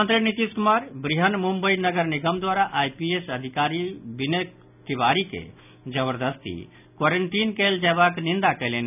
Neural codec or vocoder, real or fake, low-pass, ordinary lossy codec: none; real; 3.6 kHz; none